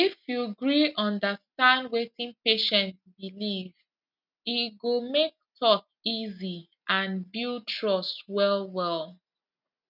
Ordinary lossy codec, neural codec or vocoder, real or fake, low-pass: none; none; real; 5.4 kHz